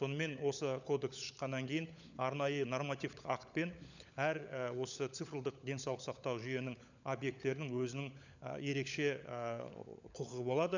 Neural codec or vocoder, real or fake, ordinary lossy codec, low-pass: codec, 16 kHz, 16 kbps, FunCodec, trained on LibriTTS, 50 frames a second; fake; none; 7.2 kHz